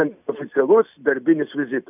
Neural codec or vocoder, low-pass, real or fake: none; 3.6 kHz; real